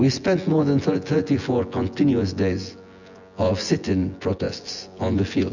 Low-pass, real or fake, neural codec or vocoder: 7.2 kHz; fake; vocoder, 24 kHz, 100 mel bands, Vocos